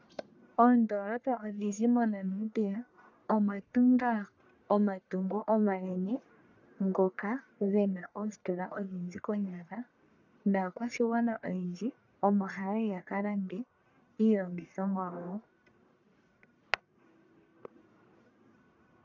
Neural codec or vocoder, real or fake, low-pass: codec, 44.1 kHz, 1.7 kbps, Pupu-Codec; fake; 7.2 kHz